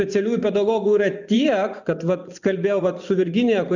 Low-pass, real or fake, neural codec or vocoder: 7.2 kHz; fake; vocoder, 24 kHz, 100 mel bands, Vocos